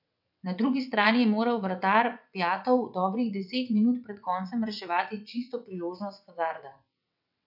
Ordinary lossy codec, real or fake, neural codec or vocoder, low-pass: none; fake; vocoder, 22.05 kHz, 80 mel bands, WaveNeXt; 5.4 kHz